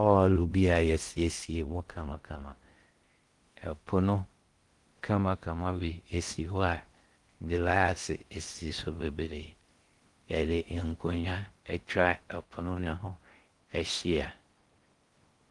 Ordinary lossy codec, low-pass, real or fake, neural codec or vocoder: Opus, 24 kbps; 10.8 kHz; fake; codec, 16 kHz in and 24 kHz out, 0.6 kbps, FocalCodec, streaming, 2048 codes